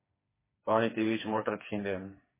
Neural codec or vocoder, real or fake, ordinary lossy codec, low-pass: codec, 16 kHz, 4 kbps, FreqCodec, smaller model; fake; MP3, 16 kbps; 3.6 kHz